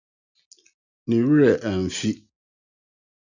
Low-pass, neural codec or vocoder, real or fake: 7.2 kHz; none; real